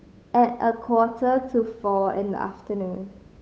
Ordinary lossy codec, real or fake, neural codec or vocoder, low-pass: none; fake; codec, 16 kHz, 8 kbps, FunCodec, trained on Chinese and English, 25 frames a second; none